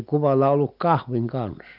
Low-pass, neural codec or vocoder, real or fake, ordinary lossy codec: 5.4 kHz; none; real; none